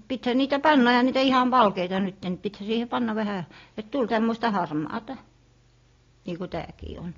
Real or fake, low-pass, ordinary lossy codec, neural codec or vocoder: real; 7.2 kHz; AAC, 32 kbps; none